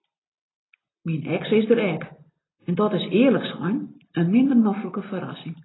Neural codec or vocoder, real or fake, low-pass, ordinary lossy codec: none; real; 7.2 kHz; AAC, 16 kbps